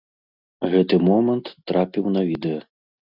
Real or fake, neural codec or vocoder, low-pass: real; none; 5.4 kHz